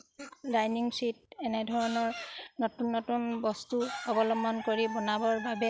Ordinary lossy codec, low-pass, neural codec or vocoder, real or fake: none; none; none; real